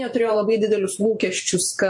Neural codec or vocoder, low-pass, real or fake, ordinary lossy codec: vocoder, 44.1 kHz, 128 mel bands every 512 samples, BigVGAN v2; 10.8 kHz; fake; MP3, 48 kbps